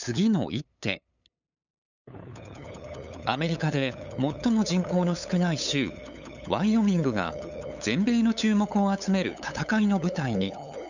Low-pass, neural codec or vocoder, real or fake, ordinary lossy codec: 7.2 kHz; codec, 16 kHz, 8 kbps, FunCodec, trained on LibriTTS, 25 frames a second; fake; none